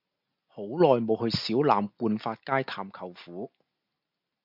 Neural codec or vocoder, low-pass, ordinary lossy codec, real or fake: none; 5.4 kHz; MP3, 48 kbps; real